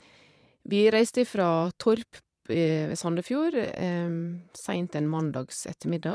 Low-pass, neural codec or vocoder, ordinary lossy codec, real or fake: none; vocoder, 22.05 kHz, 80 mel bands, Vocos; none; fake